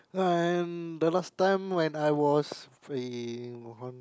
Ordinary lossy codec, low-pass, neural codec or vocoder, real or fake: none; none; none; real